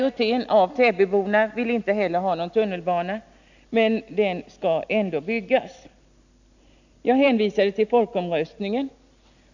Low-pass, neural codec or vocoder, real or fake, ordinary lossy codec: 7.2 kHz; none; real; none